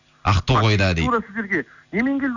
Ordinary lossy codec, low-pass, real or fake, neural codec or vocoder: none; 7.2 kHz; real; none